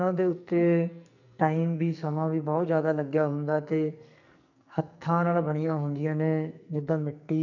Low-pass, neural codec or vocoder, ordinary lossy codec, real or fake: 7.2 kHz; codec, 44.1 kHz, 2.6 kbps, SNAC; none; fake